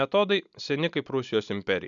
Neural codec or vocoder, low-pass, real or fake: none; 7.2 kHz; real